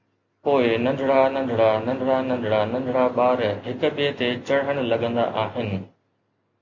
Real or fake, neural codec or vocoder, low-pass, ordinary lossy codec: real; none; 7.2 kHz; MP3, 48 kbps